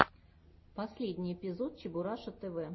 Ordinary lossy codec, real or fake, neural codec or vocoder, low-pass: MP3, 24 kbps; real; none; 7.2 kHz